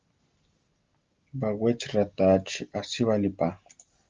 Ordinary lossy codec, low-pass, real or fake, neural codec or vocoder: Opus, 32 kbps; 7.2 kHz; real; none